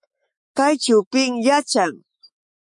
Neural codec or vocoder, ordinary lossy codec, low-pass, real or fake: autoencoder, 48 kHz, 128 numbers a frame, DAC-VAE, trained on Japanese speech; MP3, 48 kbps; 10.8 kHz; fake